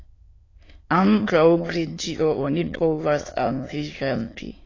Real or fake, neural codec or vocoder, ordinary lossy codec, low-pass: fake; autoencoder, 22.05 kHz, a latent of 192 numbers a frame, VITS, trained on many speakers; AAC, 32 kbps; 7.2 kHz